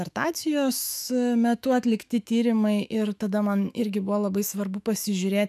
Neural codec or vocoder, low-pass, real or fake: autoencoder, 48 kHz, 128 numbers a frame, DAC-VAE, trained on Japanese speech; 14.4 kHz; fake